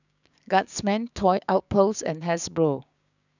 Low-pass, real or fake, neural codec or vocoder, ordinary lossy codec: 7.2 kHz; fake; codec, 16 kHz, 6 kbps, DAC; none